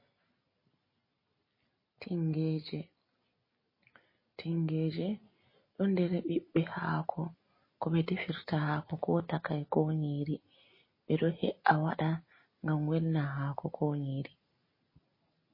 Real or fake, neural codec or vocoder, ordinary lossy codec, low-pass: real; none; MP3, 24 kbps; 5.4 kHz